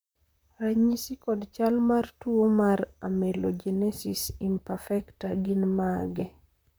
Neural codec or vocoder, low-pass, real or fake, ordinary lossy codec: vocoder, 44.1 kHz, 128 mel bands, Pupu-Vocoder; none; fake; none